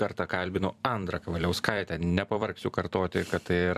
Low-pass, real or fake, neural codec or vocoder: 14.4 kHz; fake; vocoder, 44.1 kHz, 128 mel bands every 256 samples, BigVGAN v2